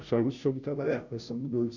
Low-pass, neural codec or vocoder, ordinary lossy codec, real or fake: 7.2 kHz; codec, 16 kHz, 0.5 kbps, FunCodec, trained on Chinese and English, 25 frames a second; none; fake